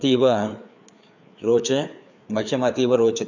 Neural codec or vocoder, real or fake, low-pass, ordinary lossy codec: codec, 16 kHz, 4 kbps, FreqCodec, larger model; fake; 7.2 kHz; none